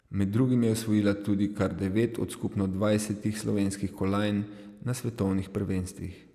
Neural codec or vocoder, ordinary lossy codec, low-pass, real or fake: none; none; 14.4 kHz; real